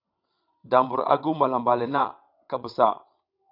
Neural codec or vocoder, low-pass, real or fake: vocoder, 22.05 kHz, 80 mel bands, WaveNeXt; 5.4 kHz; fake